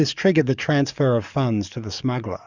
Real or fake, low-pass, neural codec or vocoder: fake; 7.2 kHz; codec, 44.1 kHz, 7.8 kbps, DAC